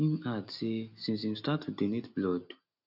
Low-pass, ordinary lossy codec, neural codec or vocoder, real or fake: 5.4 kHz; none; none; real